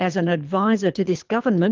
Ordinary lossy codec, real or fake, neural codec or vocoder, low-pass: Opus, 32 kbps; fake; codec, 24 kHz, 3 kbps, HILCodec; 7.2 kHz